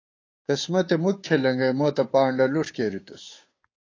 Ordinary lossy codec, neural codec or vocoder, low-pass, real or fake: AAC, 32 kbps; codec, 16 kHz, 6 kbps, DAC; 7.2 kHz; fake